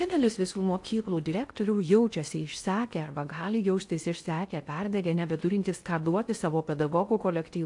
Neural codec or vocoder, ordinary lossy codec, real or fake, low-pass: codec, 16 kHz in and 24 kHz out, 0.6 kbps, FocalCodec, streaming, 4096 codes; AAC, 64 kbps; fake; 10.8 kHz